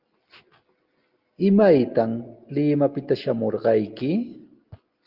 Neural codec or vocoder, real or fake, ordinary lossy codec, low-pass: none; real; Opus, 32 kbps; 5.4 kHz